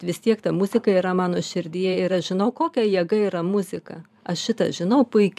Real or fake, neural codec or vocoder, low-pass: fake; vocoder, 44.1 kHz, 128 mel bands every 512 samples, BigVGAN v2; 14.4 kHz